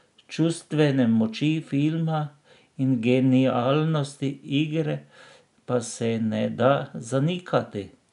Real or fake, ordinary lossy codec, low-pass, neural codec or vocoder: real; none; 10.8 kHz; none